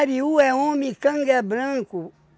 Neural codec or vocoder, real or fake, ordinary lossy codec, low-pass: none; real; none; none